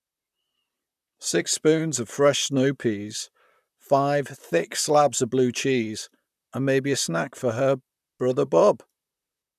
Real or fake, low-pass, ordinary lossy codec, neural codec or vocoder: fake; 14.4 kHz; none; vocoder, 44.1 kHz, 128 mel bands, Pupu-Vocoder